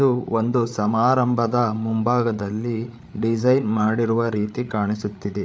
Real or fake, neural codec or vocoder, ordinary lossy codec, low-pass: fake; codec, 16 kHz, 8 kbps, FreqCodec, larger model; none; none